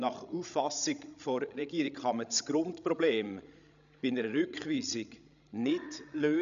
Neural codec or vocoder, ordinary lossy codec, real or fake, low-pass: codec, 16 kHz, 16 kbps, FreqCodec, larger model; none; fake; 7.2 kHz